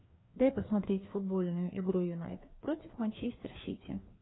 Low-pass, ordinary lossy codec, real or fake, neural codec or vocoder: 7.2 kHz; AAC, 16 kbps; fake; codec, 16 kHz, 2 kbps, FreqCodec, larger model